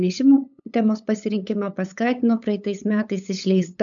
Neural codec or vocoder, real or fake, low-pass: codec, 16 kHz, 8 kbps, FunCodec, trained on LibriTTS, 25 frames a second; fake; 7.2 kHz